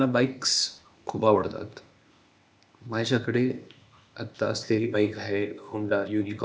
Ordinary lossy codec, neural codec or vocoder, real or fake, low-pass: none; codec, 16 kHz, 0.8 kbps, ZipCodec; fake; none